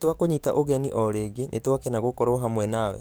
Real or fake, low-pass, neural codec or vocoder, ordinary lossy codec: fake; none; codec, 44.1 kHz, 7.8 kbps, DAC; none